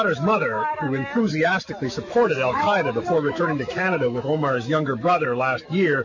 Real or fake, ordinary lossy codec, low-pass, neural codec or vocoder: fake; MP3, 32 kbps; 7.2 kHz; autoencoder, 48 kHz, 128 numbers a frame, DAC-VAE, trained on Japanese speech